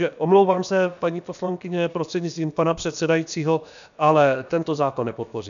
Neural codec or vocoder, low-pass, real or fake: codec, 16 kHz, about 1 kbps, DyCAST, with the encoder's durations; 7.2 kHz; fake